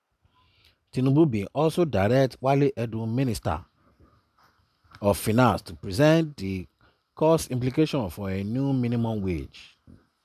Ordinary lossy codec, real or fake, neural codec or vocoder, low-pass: none; real; none; 14.4 kHz